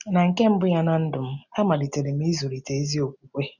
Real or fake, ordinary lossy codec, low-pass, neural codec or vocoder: real; none; 7.2 kHz; none